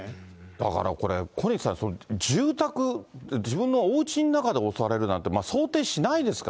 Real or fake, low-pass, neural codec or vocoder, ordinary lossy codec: real; none; none; none